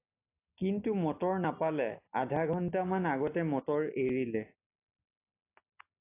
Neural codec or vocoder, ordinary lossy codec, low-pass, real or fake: none; AAC, 24 kbps; 3.6 kHz; real